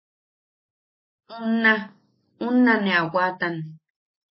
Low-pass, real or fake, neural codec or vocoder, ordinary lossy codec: 7.2 kHz; real; none; MP3, 24 kbps